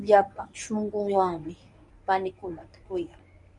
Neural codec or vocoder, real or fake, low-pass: codec, 24 kHz, 0.9 kbps, WavTokenizer, medium speech release version 1; fake; 10.8 kHz